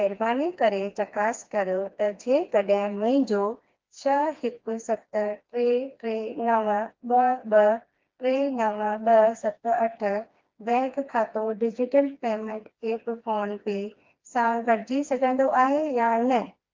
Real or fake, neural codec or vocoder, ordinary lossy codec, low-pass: fake; codec, 16 kHz, 2 kbps, FreqCodec, smaller model; Opus, 32 kbps; 7.2 kHz